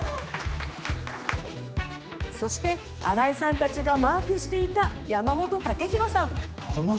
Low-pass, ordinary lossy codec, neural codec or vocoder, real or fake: none; none; codec, 16 kHz, 2 kbps, X-Codec, HuBERT features, trained on general audio; fake